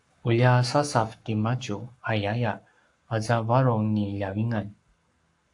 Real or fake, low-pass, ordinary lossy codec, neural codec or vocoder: fake; 10.8 kHz; AAC, 64 kbps; codec, 44.1 kHz, 7.8 kbps, Pupu-Codec